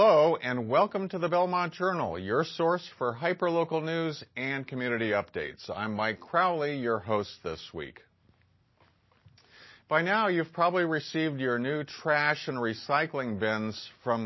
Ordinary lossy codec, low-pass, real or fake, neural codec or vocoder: MP3, 24 kbps; 7.2 kHz; real; none